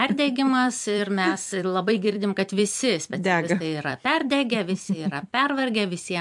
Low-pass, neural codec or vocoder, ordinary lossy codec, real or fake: 10.8 kHz; vocoder, 44.1 kHz, 128 mel bands every 256 samples, BigVGAN v2; MP3, 64 kbps; fake